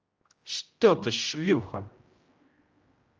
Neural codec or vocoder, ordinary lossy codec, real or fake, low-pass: codec, 16 kHz, 0.5 kbps, X-Codec, HuBERT features, trained on general audio; Opus, 24 kbps; fake; 7.2 kHz